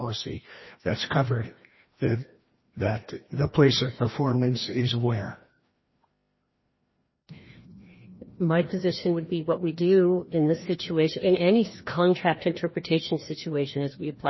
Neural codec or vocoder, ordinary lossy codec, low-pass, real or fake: codec, 16 kHz, 1 kbps, FreqCodec, larger model; MP3, 24 kbps; 7.2 kHz; fake